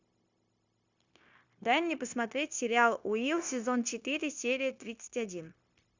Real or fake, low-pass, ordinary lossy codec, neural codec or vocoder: fake; 7.2 kHz; Opus, 64 kbps; codec, 16 kHz, 0.9 kbps, LongCat-Audio-Codec